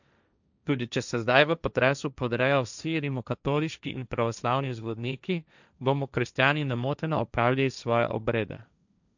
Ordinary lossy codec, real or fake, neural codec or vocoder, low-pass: none; fake; codec, 16 kHz, 1.1 kbps, Voila-Tokenizer; 7.2 kHz